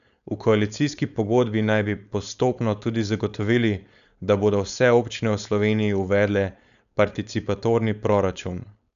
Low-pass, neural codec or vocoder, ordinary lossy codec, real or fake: 7.2 kHz; codec, 16 kHz, 4.8 kbps, FACodec; none; fake